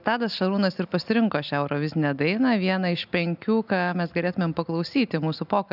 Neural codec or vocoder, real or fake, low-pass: none; real; 5.4 kHz